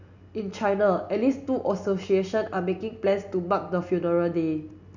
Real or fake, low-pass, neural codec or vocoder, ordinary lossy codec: real; 7.2 kHz; none; none